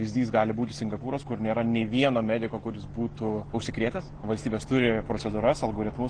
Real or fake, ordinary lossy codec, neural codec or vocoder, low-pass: real; Opus, 16 kbps; none; 9.9 kHz